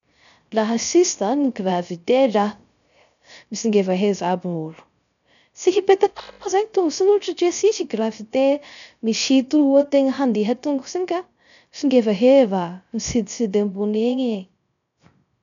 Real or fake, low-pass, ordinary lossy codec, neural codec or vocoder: fake; 7.2 kHz; none; codec, 16 kHz, 0.3 kbps, FocalCodec